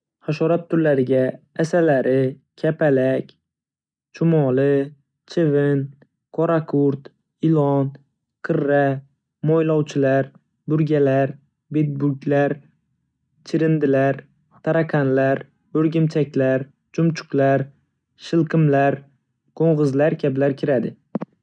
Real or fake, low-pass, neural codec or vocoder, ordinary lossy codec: real; 9.9 kHz; none; none